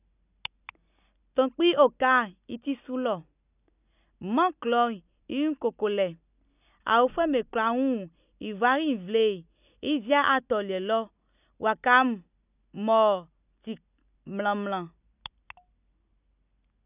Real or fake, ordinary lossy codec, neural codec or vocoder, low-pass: real; none; none; 3.6 kHz